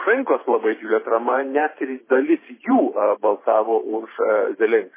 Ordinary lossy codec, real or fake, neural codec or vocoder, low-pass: MP3, 16 kbps; fake; vocoder, 44.1 kHz, 128 mel bands every 512 samples, BigVGAN v2; 3.6 kHz